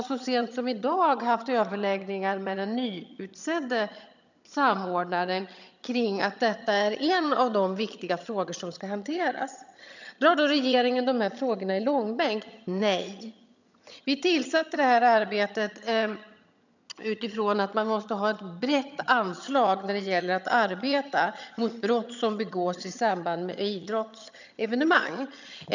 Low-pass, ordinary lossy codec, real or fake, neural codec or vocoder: 7.2 kHz; none; fake; vocoder, 22.05 kHz, 80 mel bands, HiFi-GAN